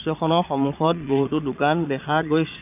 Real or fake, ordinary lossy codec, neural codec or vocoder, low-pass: fake; none; vocoder, 22.05 kHz, 80 mel bands, Vocos; 3.6 kHz